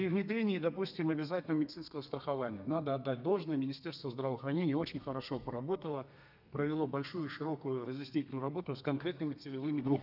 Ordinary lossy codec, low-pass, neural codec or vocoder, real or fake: none; 5.4 kHz; codec, 32 kHz, 1.9 kbps, SNAC; fake